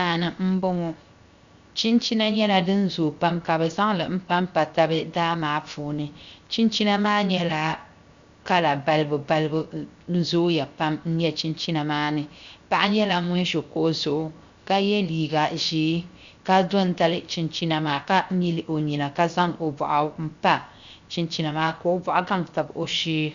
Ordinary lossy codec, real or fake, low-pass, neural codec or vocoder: Opus, 64 kbps; fake; 7.2 kHz; codec, 16 kHz, 0.7 kbps, FocalCodec